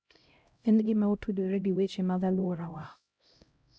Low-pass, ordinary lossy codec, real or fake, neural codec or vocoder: none; none; fake; codec, 16 kHz, 0.5 kbps, X-Codec, HuBERT features, trained on LibriSpeech